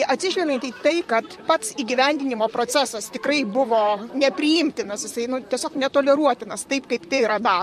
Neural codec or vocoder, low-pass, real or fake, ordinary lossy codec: vocoder, 44.1 kHz, 128 mel bands, Pupu-Vocoder; 14.4 kHz; fake; MP3, 64 kbps